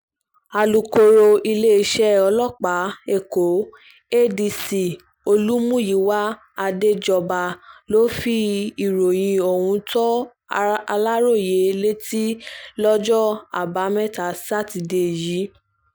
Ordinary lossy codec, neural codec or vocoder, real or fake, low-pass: none; none; real; none